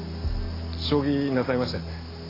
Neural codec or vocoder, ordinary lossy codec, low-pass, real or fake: none; AAC, 24 kbps; 5.4 kHz; real